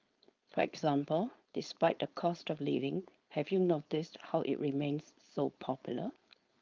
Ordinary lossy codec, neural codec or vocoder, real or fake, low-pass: Opus, 24 kbps; codec, 16 kHz, 4.8 kbps, FACodec; fake; 7.2 kHz